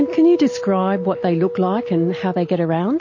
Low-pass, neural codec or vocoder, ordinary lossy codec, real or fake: 7.2 kHz; none; MP3, 32 kbps; real